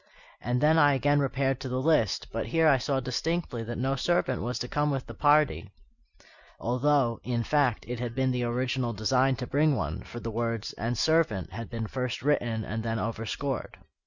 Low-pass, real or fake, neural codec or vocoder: 7.2 kHz; real; none